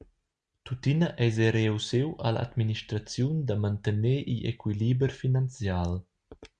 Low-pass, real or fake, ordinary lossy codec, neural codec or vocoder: 9.9 kHz; real; Opus, 64 kbps; none